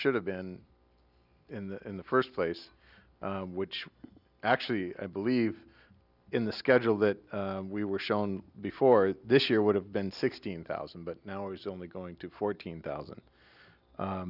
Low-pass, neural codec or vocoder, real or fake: 5.4 kHz; none; real